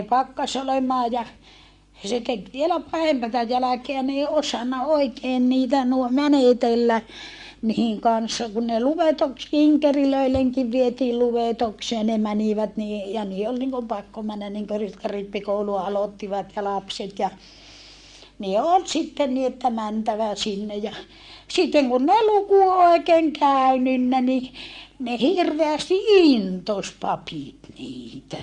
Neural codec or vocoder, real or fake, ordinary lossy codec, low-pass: codec, 44.1 kHz, 7.8 kbps, Pupu-Codec; fake; Opus, 64 kbps; 10.8 kHz